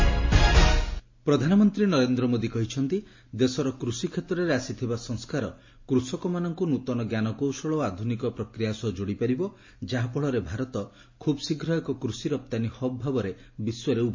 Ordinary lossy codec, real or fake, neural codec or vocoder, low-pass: MP3, 32 kbps; real; none; 7.2 kHz